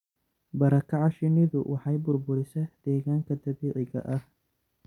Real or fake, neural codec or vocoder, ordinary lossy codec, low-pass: real; none; none; 19.8 kHz